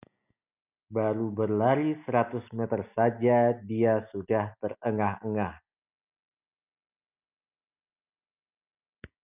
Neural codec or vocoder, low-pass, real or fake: none; 3.6 kHz; real